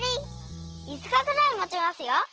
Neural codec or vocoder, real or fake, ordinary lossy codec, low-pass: none; real; Opus, 16 kbps; 7.2 kHz